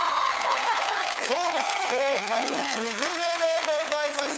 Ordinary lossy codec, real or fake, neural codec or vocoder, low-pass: none; fake; codec, 16 kHz, 4 kbps, FunCodec, trained on LibriTTS, 50 frames a second; none